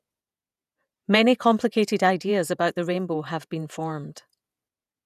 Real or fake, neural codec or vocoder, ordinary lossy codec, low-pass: fake; vocoder, 48 kHz, 128 mel bands, Vocos; none; 14.4 kHz